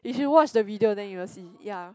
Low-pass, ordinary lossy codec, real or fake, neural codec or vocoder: none; none; real; none